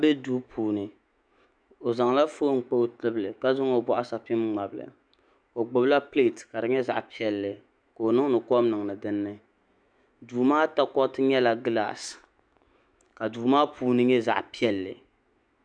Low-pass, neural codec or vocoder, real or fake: 9.9 kHz; autoencoder, 48 kHz, 128 numbers a frame, DAC-VAE, trained on Japanese speech; fake